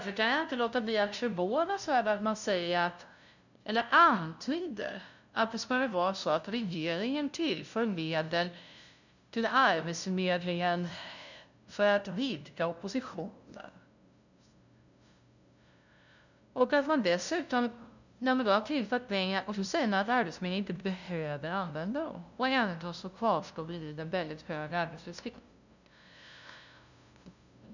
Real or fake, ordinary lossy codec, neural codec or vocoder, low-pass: fake; none; codec, 16 kHz, 0.5 kbps, FunCodec, trained on LibriTTS, 25 frames a second; 7.2 kHz